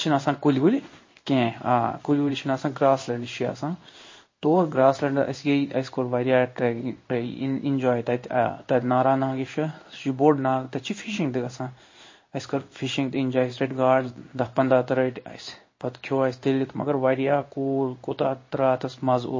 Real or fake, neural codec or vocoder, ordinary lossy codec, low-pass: fake; codec, 16 kHz in and 24 kHz out, 1 kbps, XY-Tokenizer; MP3, 32 kbps; 7.2 kHz